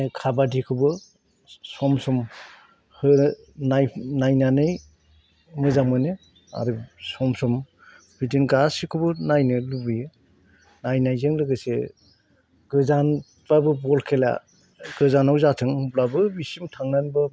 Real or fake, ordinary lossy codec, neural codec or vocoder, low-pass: real; none; none; none